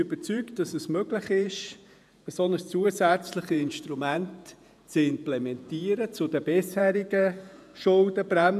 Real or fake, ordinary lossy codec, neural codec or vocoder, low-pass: fake; none; vocoder, 48 kHz, 128 mel bands, Vocos; 14.4 kHz